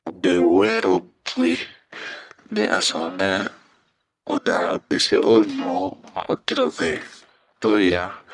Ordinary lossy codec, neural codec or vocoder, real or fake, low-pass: none; codec, 44.1 kHz, 1.7 kbps, Pupu-Codec; fake; 10.8 kHz